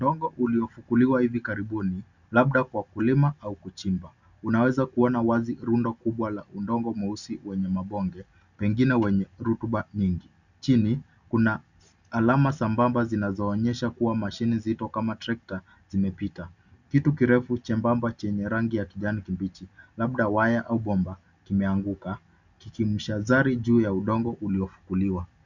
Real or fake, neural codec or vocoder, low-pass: real; none; 7.2 kHz